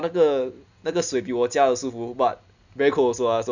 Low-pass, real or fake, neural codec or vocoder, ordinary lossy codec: 7.2 kHz; real; none; none